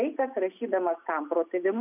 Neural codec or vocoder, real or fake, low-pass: none; real; 3.6 kHz